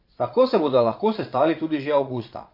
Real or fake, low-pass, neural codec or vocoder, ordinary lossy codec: fake; 5.4 kHz; vocoder, 22.05 kHz, 80 mel bands, Vocos; MP3, 32 kbps